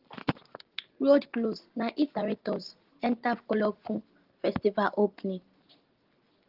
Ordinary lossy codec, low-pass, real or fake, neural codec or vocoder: Opus, 16 kbps; 5.4 kHz; real; none